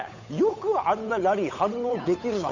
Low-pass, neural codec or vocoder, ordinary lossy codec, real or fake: 7.2 kHz; codec, 16 kHz, 8 kbps, FunCodec, trained on Chinese and English, 25 frames a second; none; fake